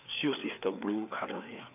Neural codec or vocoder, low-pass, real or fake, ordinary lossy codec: codec, 16 kHz, 4 kbps, FreqCodec, larger model; 3.6 kHz; fake; none